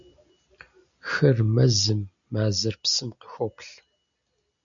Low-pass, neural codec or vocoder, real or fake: 7.2 kHz; none; real